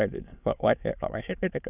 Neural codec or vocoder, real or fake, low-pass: autoencoder, 22.05 kHz, a latent of 192 numbers a frame, VITS, trained on many speakers; fake; 3.6 kHz